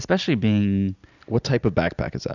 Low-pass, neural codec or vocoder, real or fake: 7.2 kHz; none; real